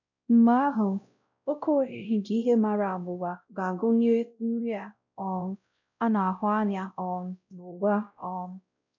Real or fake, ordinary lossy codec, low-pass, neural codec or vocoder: fake; none; 7.2 kHz; codec, 16 kHz, 0.5 kbps, X-Codec, WavLM features, trained on Multilingual LibriSpeech